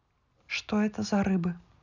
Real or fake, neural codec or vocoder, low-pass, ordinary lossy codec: real; none; 7.2 kHz; none